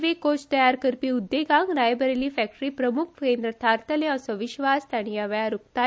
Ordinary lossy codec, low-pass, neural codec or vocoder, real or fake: none; none; none; real